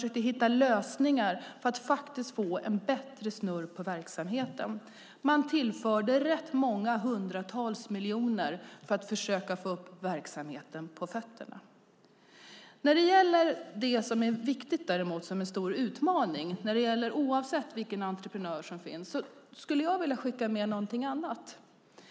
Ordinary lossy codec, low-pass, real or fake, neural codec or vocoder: none; none; real; none